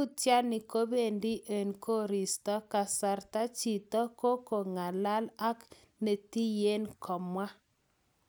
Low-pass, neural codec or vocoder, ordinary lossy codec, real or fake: none; none; none; real